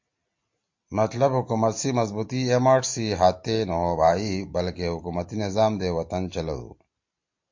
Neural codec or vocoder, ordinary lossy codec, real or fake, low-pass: none; MP3, 48 kbps; real; 7.2 kHz